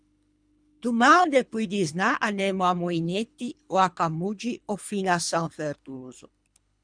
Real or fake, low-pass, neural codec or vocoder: fake; 9.9 kHz; codec, 24 kHz, 3 kbps, HILCodec